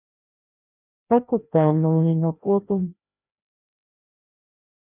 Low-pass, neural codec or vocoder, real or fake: 3.6 kHz; codec, 16 kHz, 1 kbps, FreqCodec, larger model; fake